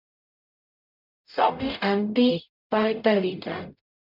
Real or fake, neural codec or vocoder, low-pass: fake; codec, 44.1 kHz, 0.9 kbps, DAC; 5.4 kHz